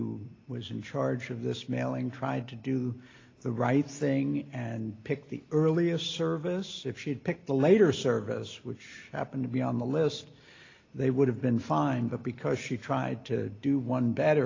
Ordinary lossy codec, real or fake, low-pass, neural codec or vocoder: AAC, 32 kbps; real; 7.2 kHz; none